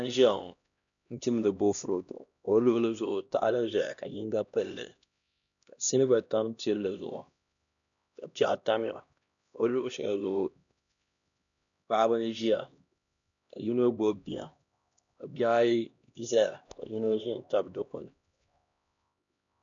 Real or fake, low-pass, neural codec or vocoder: fake; 7.2 kHz; codec, 16 kHz, 1 kbps, X-Codec, HuBERT features, trained on LibriSpeech